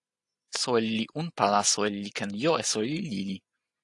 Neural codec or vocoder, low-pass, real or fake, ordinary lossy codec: none; 10.8 kHz; real; AAC, 64 kbps